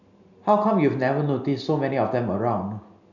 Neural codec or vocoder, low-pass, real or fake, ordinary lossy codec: none; 7.2 kHz; real; none